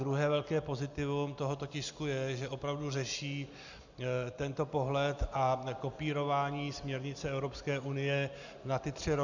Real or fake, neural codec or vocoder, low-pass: real; none; 7.2 kHz